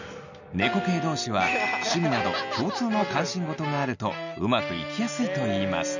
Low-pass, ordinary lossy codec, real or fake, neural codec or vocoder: 7.2 kHz; none; real; none